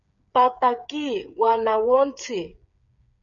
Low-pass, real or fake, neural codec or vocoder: 7.2 kHz; fake; codec, 16 kHz, 8 kbps, FreqCodec, smaller model